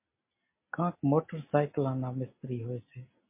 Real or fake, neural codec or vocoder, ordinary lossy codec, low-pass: real; none; MP3, 24 kbps; 3.6 kHz